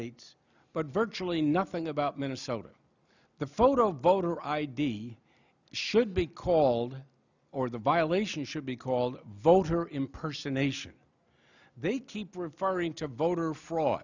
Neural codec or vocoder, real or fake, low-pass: none; real; 7.2 kHz